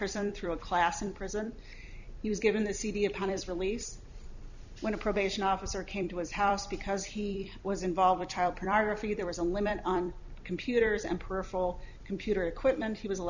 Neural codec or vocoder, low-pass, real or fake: vocoder, 44.1 kHz, 128 mel bands every 256 samples, BigVGAN v2; 7.2 kHz; fake